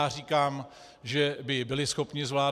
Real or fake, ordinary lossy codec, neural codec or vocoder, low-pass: real; AAC, 96 kbps; none; 14.4 kHz